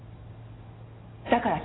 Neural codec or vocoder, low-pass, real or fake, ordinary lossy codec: none; 7.2 kHz; real; AAC, 16 kbps